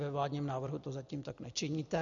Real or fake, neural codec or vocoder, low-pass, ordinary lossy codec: real; none; 7.2 kHz; MP3, 96 kbps